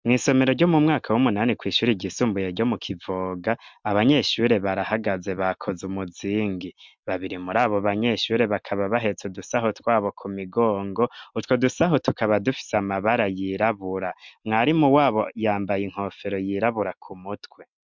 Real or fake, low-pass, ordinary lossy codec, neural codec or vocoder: real; 7.2 kHz; MP3, 64 kbps; none